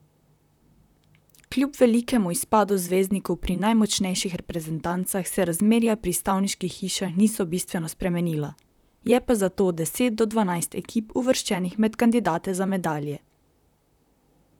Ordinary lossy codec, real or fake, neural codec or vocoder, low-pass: none; fake; vocoder, 44.1 kHz, 128 mel bands, Pupu-Vocoder; 19.8 kHz